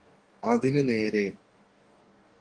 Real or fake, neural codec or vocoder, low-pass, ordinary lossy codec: fake; codec, 44.1 kHz, 2.6 kbps, DAC; 9.9 kHz; Opus, 24 kbps